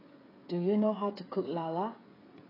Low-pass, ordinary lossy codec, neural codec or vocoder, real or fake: 5.4 kHz; AAC, 24 kbps; codec, 16 kHz, 16 kbps, FreqCodec, smaller model; fake